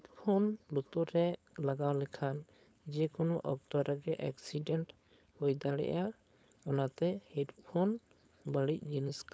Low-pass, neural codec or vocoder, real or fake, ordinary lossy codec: none; codec, 16 kHz, 4.8 kbps, FACodec; fake; none